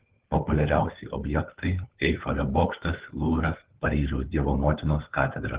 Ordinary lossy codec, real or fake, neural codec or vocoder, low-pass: Opus, 16 kbps; fake; codec, 16 kHz, 4 kbps, FunCodec, trained on LibriTTS, 50 frames a second; 3.6 kHz